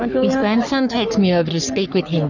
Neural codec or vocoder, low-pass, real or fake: codec, 44.1 kHz, 3.4 kbps, Pupu-Codec; 7.2 kHz; fake